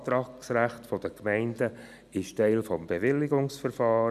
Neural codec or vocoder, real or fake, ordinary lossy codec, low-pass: none; real; none; 14.4 kHz